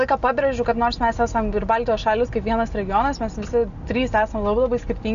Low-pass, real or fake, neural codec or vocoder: 7.2 kHz; real; none